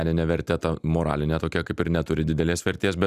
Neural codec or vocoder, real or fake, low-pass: none; real; 14.4 kHz